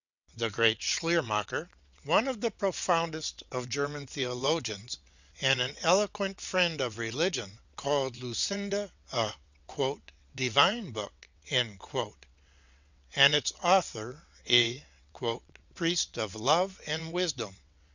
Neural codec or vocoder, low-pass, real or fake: vocoder, 22.05 kHz, 80 mel bands, WaveNeXt; 7.2 kHz; fake